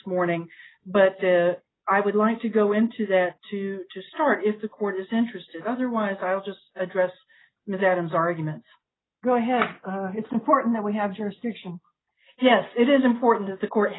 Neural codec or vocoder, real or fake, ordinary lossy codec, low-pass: none; real; AAC, 16 kbps; 7.2 kHz